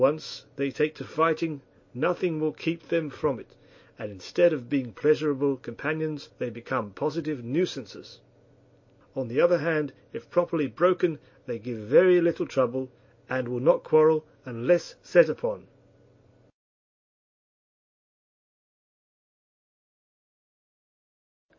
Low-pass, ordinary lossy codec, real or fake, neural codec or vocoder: 7.2 kHz; MP3, 32 kbps; real; none